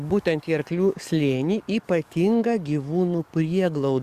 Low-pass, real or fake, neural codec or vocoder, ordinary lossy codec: 14.4 kHz; fake; codec, 44.1 kHz, 7.8 kbps, DAC; Opus, 64 kbps